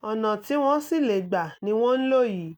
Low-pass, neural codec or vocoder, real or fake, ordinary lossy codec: none; none; real; none